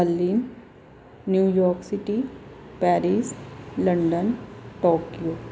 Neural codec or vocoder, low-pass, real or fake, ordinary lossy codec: none; none; real; none